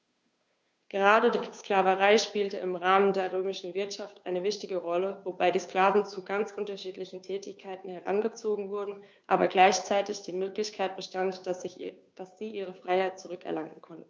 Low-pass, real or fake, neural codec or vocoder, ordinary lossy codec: none; fake; codec, 16 kHz, 2 kbps, FunCodec, trained on Chinese and English, 25 frames a second; none